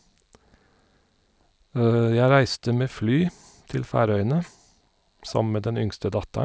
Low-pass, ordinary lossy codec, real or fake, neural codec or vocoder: none; none; real; none